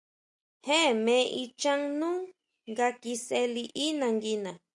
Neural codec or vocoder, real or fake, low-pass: none; real; 10.8 kHz